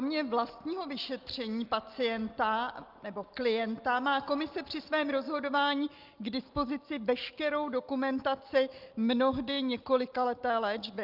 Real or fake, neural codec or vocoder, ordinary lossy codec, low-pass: real; none; Opus, 24 kbps; 5.4 kHz